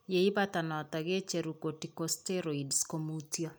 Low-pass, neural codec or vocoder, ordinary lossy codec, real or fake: none; none; none; real